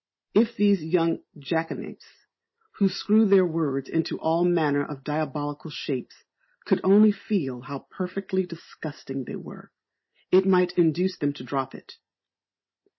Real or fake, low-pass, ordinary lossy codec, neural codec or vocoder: real; 7.2 kHz; MP3, 24 kbps; none